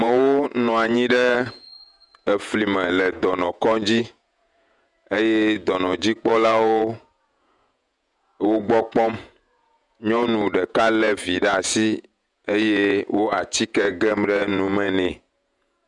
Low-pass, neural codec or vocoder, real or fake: 10.8 kHz; vocoder, 48 kHz, 128 mel bands, Vocos; fake